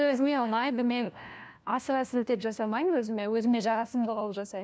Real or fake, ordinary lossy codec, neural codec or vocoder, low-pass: fake; none; codec, 16 kHz, 1 kbps, FunCodec, trained on LibriTTS, 50 frames a second; none